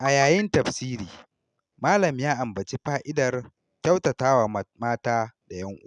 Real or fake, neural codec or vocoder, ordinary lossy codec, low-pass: real; none; none; 10.8 kHz